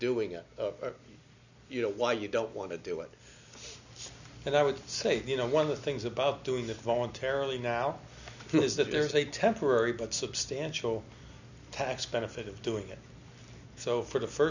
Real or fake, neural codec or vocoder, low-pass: real; none; 7.2 kHz